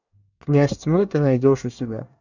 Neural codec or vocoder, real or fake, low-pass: codec, 24 kHz, 1 kbps, SNAC; fake; 7.2 kHz